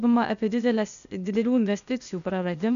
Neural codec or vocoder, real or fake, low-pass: codec, 16 kHz, 0.8 kbps, ZipCodec; fake; 7.2 kHz